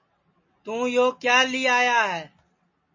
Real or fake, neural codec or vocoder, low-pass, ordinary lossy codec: real; none; 7.2 kHz; MP3, 32 kbps